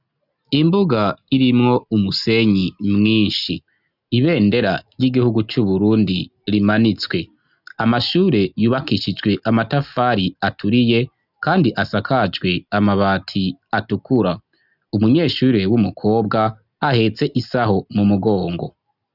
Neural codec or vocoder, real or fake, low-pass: none; real; 5.4 kHz